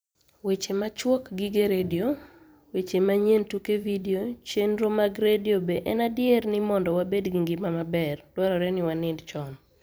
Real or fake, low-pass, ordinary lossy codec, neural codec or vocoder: fake; none; none; vocoder, 44.1 kHz, 128 mel bands every 512 samples, BigVGAN v2